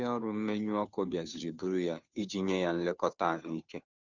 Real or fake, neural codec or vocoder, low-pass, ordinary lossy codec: fake; codec, 16 kHz, 2 kbps, FunCodec, trained on Chinese and English, 25 frames a second; 7.2 kHz; none